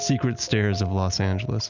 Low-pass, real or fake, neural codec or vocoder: 7.2 kHz; real; none